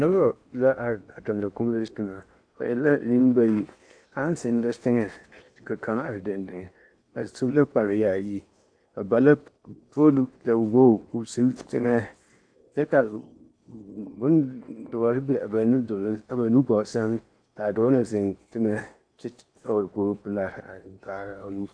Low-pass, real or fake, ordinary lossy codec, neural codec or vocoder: 9.9 kHz; fake; AAC, 64 kbps; codec, 16 kHz in and 24 kHz out, 0.8 kbps, FocalCodec, streaming, 65536 codes